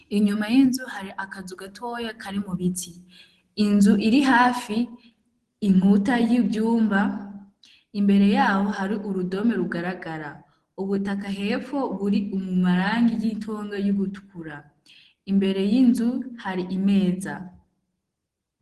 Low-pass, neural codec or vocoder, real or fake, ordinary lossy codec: 10.8 kHz; none; real; Opus, 16 kbps